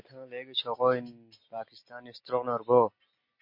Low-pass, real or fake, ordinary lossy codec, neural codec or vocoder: 5.4 kHz; real; MP3, 32 kbps; none